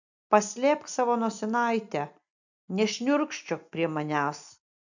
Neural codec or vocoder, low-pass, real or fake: none; 7.2 kHz; real